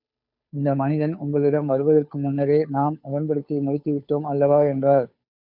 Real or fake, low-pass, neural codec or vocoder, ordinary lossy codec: fake; 5.4 kHz; codec, 16 kHz, 2 kbps, FunCodec, trained on Chinese and English, 25 frames a second; MP3, 48 kbps